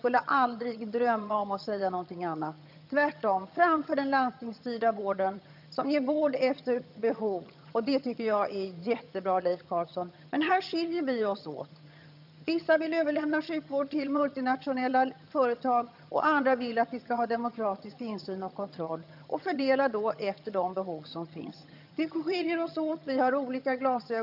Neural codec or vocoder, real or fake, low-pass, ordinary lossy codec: vocoder, 22.05 kHz, 80 mel bands, HiFi-GAN; fake; 5.4 kHz; none